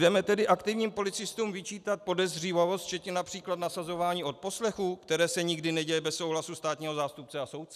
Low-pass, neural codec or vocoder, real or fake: 14.4 kHz; none; real